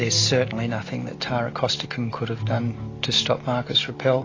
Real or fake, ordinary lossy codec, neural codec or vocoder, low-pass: real; AAC, 32 kbps; none; 7.2 kHz